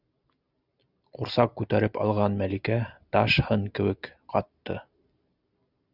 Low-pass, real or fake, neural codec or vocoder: 5.4 kHz; real; none